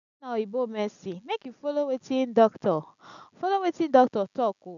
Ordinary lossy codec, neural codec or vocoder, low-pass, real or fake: none; none; 7.2 kHz; real